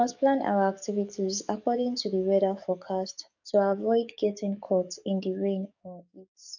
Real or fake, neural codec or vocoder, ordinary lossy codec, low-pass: fake; codec, 44.1 kHz, 7.8 kbps, DAC; none; 7.2 kHz